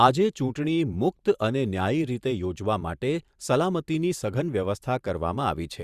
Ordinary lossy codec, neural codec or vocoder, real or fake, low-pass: Opus, 64 kbps; vocoder, 44.1 kHz, 128 mel bands every 512 samples, BigVGAN v2; fake; 14.4 kHz